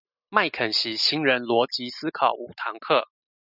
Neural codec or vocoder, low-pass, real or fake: none; 5.4 kHz; real